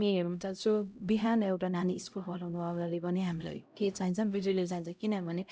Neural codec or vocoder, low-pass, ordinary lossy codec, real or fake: codec, 16 kHz, 0.5 kbps, X-Codec, HuBERT features, trained on LibriSpeech; none; none; fake